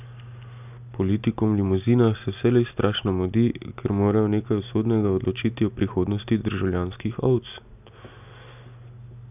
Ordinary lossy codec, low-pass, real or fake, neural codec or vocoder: none; 3.6 kHz; real; none